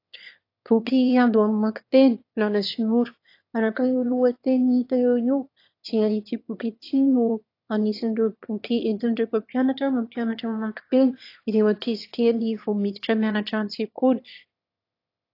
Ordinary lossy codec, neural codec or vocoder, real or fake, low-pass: AAC, 32 kbps; autoencoder, 22.05 kHz, a latent of 192 numbers a frame, VITS, trained on one speaker; fake; 5.4 kHz